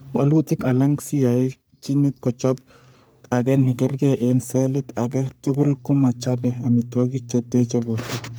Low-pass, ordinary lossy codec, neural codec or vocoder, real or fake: none; none; codec, 44.1 kHz, 3.4 kbps, Pupu-Codec; fake